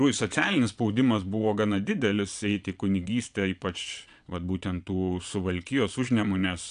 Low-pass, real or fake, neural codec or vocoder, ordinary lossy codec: 9.9 kHz; fake; vocoder, 22.05 kHz, 80 mel bands, Vocos; AAC, 96 kbps